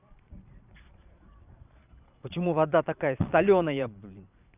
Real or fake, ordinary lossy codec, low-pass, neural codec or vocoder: fake; none; 3.6 kHz; vocoder, 22.05 kHz, 80 mel bands, Vocos